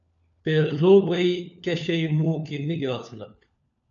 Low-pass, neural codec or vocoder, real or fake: 7.2 kHz; codec, 16 kHz, 4 kbps, FunCodec, trained on LibriTTS, 50 frames a second; fake